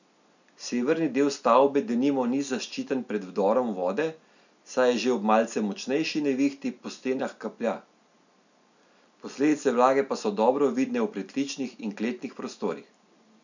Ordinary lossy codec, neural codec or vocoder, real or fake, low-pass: none; none; real; 7.2 kHz